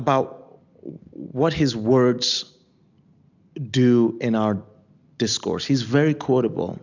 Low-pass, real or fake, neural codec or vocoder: 7.2 kHz; real; none